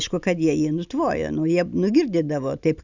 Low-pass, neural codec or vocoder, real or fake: 7.2 kHz; none; real